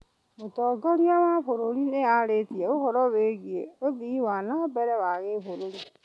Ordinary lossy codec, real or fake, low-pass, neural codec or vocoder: none; real; none; none